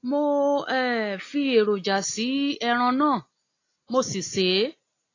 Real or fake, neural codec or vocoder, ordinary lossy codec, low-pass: real; none; AAC, 32 kbps; 7.2 kHz